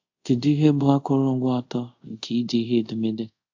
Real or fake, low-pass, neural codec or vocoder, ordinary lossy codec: fake; 7.2 kHz; codec, 24 kHz, 0.5 kbps, DualCodec; AAC, 48 kbps